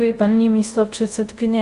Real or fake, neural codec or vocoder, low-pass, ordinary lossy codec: fake; codec, 24 kHz, 0.5 kbps, DualCodec; 10.8 kHz; Opus, 64 kbps